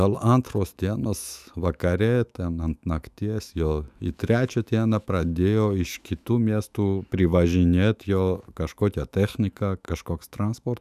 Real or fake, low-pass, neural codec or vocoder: fake; 14.4 kHz; autoencoder, 48 kHz, 128 numbers a frame, DAC-VAE, trained on Japanese speech